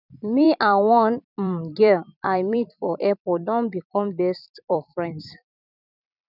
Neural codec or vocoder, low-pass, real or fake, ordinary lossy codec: vocoder, 44.1 kHz, 128 mel bands every 256 samples, BigVGAN v2; 5.4 kHz; fake; none